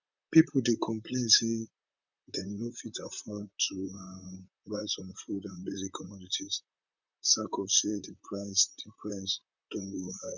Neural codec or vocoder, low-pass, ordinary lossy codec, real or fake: vocoder, 44.1 kHz, 128 mel bands, Pupu-Vocoder; 7.2 kHz; none; fake